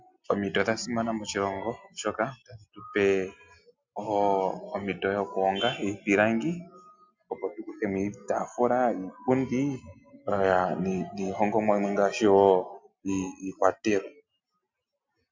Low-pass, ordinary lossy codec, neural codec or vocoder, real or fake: 7.2 kHz; MP3, 64 kbps; none; real